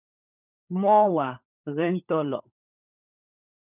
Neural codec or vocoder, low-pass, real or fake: codec, 16 kHz, 16 kbps, FunCodec, trained on LibriTTS, 50 frames a second; 3.6 kHz; fake